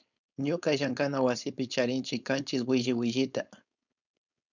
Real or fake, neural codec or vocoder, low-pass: fake; codec, 16 kHz, 4.8 kbps, FACodec; 7.2 kHz